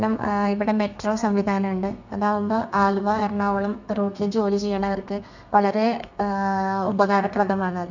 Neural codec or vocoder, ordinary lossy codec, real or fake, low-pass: codec, 32 kHz, 1.9 kbps, SNAC; none; fake; 7.2 kHz